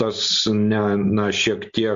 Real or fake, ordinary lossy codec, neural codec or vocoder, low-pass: real; MP3, 48 kbps; none; 7.2 kHz